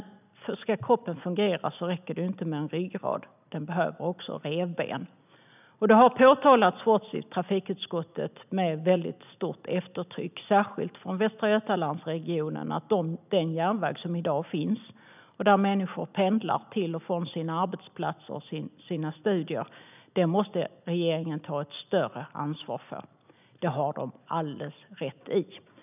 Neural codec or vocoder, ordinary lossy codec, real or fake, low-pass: none; none; real; 3.6 kHz